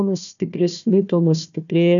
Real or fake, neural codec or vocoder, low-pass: fake; codec, 16 kHz, 1 kbps, FunCodec, trained on Chinese and English, 50 frames a second; 7.2 kHz